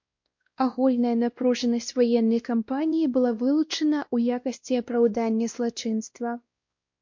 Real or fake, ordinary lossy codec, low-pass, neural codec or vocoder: fake; MP3, 48 kbps; 7.2 kHz; codec, 16 kHz, 1 kbps, X-Codec, WavLM features, trained on Multilingual LibriSpeech